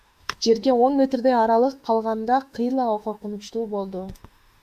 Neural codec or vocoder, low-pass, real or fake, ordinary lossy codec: autoencoder, 48 kHz, 32 numbers a frame, DAC-VAE, trained on Japanese speech; 14.4 kHz; fake; Opus, 64 kbps